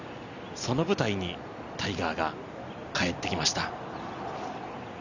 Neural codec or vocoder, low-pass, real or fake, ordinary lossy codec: none; 7.2 kHz; real; none